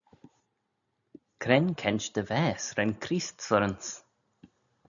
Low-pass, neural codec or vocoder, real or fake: 7.2 kHz; none; real